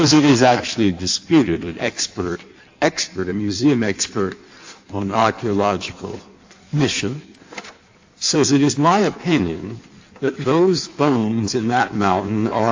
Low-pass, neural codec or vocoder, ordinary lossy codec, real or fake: 7.2 kHz; codec, 16 kHz in and 24 kHz out, 1.1 kbps, FireRedTTS-2 codec; AAC, 48 kbps; fake